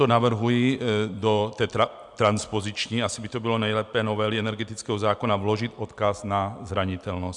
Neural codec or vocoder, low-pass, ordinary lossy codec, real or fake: none; 10.8 kHz; MP3, 96 kbps; real